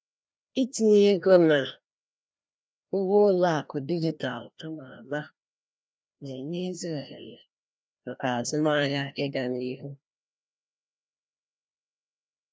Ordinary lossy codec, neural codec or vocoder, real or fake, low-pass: none; codec, 16 kHz, 1 kbps, FreqCodec, larger model; fake; none